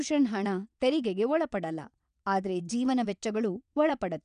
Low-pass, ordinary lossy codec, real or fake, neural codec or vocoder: 9.9 kHz; none; fake; vocoder, 22.05 kHz, 80 mel bands, WaveNeXt